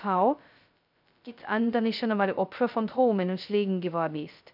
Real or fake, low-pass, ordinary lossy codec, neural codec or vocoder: fake; 5.4 kHz; none; codec, 16 kHz, 0.2 kbps, FocalCodec